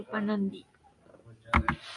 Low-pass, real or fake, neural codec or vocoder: 10.8 kHz; real; none